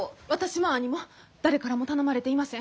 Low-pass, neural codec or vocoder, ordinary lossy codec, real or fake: none; none; none; real